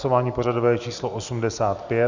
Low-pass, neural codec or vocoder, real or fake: 7.2 kHz; none; real